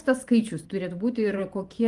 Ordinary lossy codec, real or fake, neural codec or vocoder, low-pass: Opus, 24 kbps; fake; vocoder, 44.1 kHz, 128 mel bands every 512 samples, BigVGAN v2; 10.8 kHz